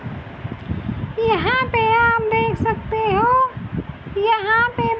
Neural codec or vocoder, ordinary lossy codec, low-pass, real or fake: none; none; none; real